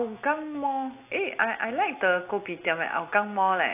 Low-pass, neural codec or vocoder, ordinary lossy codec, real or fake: 3.6 kHz; none; AAC, 24 kbps; real